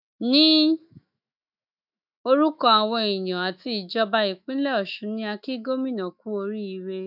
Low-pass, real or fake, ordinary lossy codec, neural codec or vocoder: 5.4 kHz; fake; none; autoencoder, 48 kHz, 128 numbers a frame, DAC-VAE, trained on Japanese speech